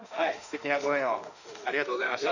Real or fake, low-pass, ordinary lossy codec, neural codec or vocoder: fake; 7.2 kHz; none; autoencoder, 48 kHz, 32 numbers a frame, DAC-VAE, trained on Japanese speech